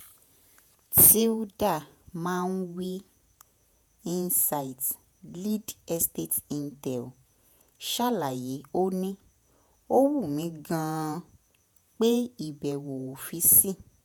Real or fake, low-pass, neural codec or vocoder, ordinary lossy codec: fake; none; vocoder, 48 kHz, 128 mel bands, Vocos; none